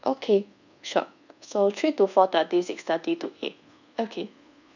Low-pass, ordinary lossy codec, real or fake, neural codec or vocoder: 7.2 kHz; none; fake; codec, 24 kHz, 1.2 kbps, DualCodec